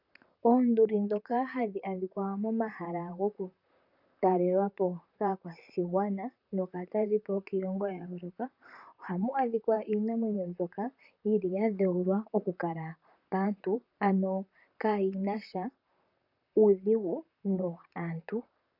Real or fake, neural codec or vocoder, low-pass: fake; vocoder, 44.1 kHz, 128 mel bands, Pupu-Vocoder; 5.4 kHz